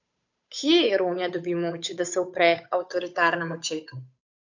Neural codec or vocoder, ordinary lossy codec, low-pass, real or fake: codec, 16 kHz, 8 kbps, FunCodec, trained on Chinese and English, 25 frames a second; none; 7.2 kHz; fake